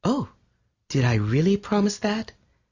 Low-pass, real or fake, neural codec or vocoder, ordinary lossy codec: 7.2 kHz; real; none; Opus, 64 kbps